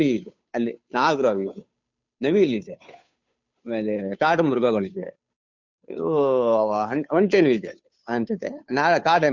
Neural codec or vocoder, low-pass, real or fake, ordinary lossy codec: codec, 16 kHz, 2 kbps, FunCodec, trained on Chinese and English, 25 frames a second; 7.2 kHz; fake; none